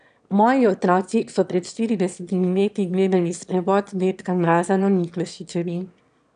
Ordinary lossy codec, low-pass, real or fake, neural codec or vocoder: none; 9.9 kHz; fake; autoencoder, 22.05 kHz, a latent of 192 numbers a frame, VITS, trained on one speaker